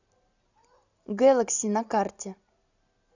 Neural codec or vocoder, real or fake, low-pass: none; real; 7.2 kHz